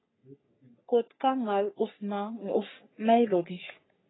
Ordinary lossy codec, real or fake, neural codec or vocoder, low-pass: AAC, 16 kbps; fake; codec, 44.1 kHz, 3.4 kbps, Pupu-Codec; 7.2 kHz